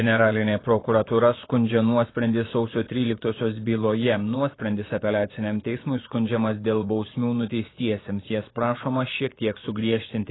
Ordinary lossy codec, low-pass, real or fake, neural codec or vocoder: AAC, 16 kbps; 7.2 kHz; fake; vocoder, 24 kHz, 100 mel bands, Vocos